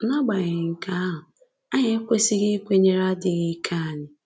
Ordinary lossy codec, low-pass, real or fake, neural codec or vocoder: none; none; real; none